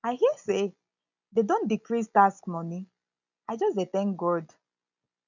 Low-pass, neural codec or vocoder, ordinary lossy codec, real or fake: 7.2 kHz; none; none; real